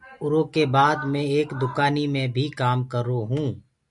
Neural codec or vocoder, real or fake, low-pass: none; real; 10.8 kHz